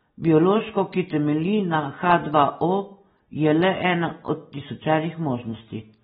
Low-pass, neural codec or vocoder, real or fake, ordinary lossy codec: 19.8 kHz; none; real; AAC, 16 kbps